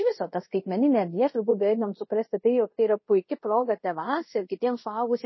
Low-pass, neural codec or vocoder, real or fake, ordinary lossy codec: 7.2 kHz; codec, 24 kHz, 0.5 kbps, DualCodec; fake; MP3, 24 kbps